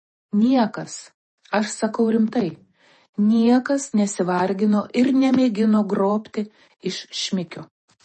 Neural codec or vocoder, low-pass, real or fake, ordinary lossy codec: vocoder, 48 kHz, 128 mel bands, Vocos; 10.8 kHz; fake; MP3, 32 kbps